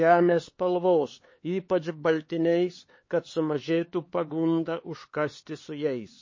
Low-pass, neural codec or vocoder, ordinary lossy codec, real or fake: 7.2 kHz; codec, 16 kHz, 4 kbps, X-Codec, HuBERT features, trained on LibriSpeech; MP3, 32 kbps; fake